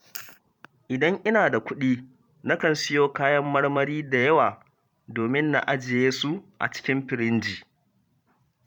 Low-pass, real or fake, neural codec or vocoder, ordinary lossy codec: 19.8 kHz; fake; vocoder, 44.1 kHz, 128 mel bands every 512 samples, BigVGAN v2; none